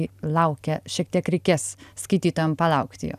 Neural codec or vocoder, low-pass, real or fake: none; 14.4 kHz; real